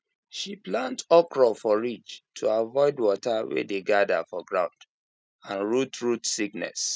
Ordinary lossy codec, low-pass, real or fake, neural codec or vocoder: none; none; real; none